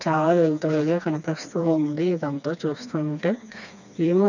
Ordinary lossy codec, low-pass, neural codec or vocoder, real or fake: none; 7.2 kHz; codec, 16 kHz, 2 kbps, FreqCodec, smaller model; fake